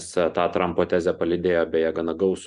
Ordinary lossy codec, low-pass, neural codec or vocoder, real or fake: MP3, 96 kbps; 10.8 kHz; none; real